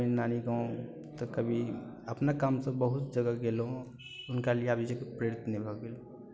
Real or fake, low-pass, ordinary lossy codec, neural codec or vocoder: real; none; none; none